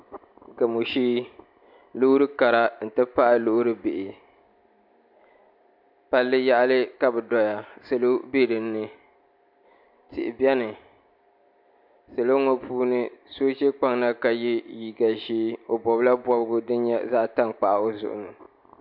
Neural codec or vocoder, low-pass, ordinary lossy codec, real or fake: none; 5.4 kHz; MP3, 48 kbps; real